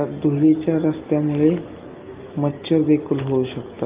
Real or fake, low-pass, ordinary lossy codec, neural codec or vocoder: real; 3.6 kHz; Opus, 32 kbps; none